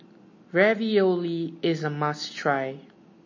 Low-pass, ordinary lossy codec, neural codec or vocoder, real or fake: 7.2 kHz; MP3, 32 kbps; none; real